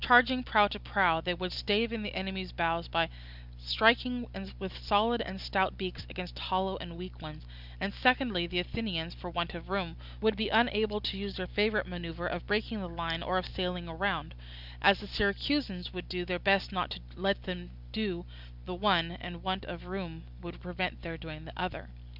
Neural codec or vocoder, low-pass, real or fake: none; 5.4 kHz; real